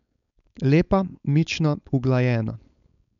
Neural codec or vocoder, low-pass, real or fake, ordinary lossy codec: codec, 16 kHz, 4.8 kbps, FACodec; 7.2 kHz; fake; none